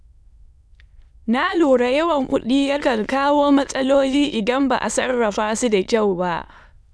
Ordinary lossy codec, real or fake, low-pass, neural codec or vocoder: none; fake; none; autoencoder, 22.05 kHz, a latent of 192 numbers a frame, VITS, trained on many speakers